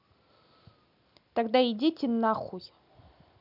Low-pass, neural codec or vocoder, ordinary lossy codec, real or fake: 5.4 kHz; none; none; real